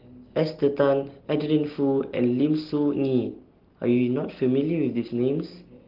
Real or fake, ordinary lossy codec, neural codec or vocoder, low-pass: real; Opus, 16 kbps; none; 5.4 kHz